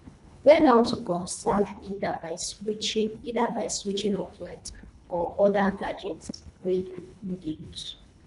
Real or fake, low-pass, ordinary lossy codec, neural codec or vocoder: fake; 10.8 kHz; none; codec, 24 kHz, 1.5 kbps, HILCodec